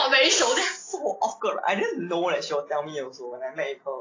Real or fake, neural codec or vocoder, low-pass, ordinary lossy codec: real; none; 7.2 kHz; AAC, 32 kbps